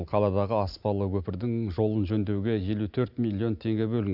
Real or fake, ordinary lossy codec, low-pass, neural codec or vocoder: real; none; 5.4 kHz; none